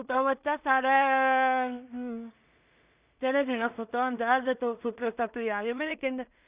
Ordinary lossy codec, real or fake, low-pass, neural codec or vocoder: Opus, 64 kbps; fake; 3.6 kHz; codec, 16 kHz in and 24 kHz out, 0.4 kbps, LongCat-Audio-Codec, two codebook decoder